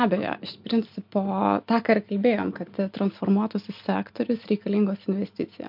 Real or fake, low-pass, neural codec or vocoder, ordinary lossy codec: real; 5.4 kHz; none; MP3, 48 kbps